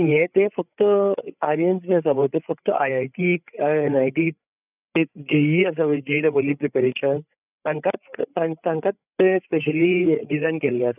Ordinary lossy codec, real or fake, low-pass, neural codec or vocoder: none; fake; 3.6 kHz; codec, 16 kHz, 8 kbps, FreqCodec, larger model